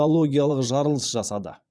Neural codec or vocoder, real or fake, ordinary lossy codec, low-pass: vocoder, 22.05 kHz, 80 mel bands, Vocos; fake; none; none